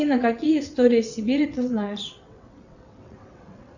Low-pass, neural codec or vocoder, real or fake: 7.2 kHz; vocoder, 22.05 kHz, 80 mel bands, WaveNeXt; fake